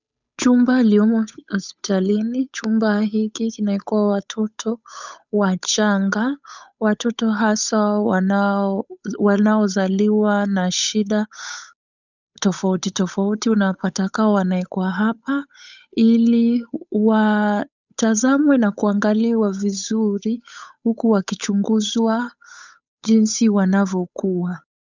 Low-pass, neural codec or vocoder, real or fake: 7.2 kHz; codec, 16 kHz, 8 kbps, FunCodec, trained on Chinese and English, 25 frames a second; fake